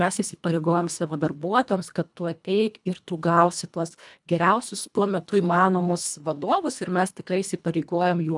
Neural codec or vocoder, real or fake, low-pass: codec, 24 kHz, 1.5 kbps, HILCodec; fake; 10.8 kHz